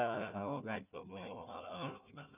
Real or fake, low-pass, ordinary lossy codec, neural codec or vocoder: fake; 3.6 kHz; none; codec, 16 kHz, 1 kbps, FunCodec, trained on Chinese and English, 50 frames a second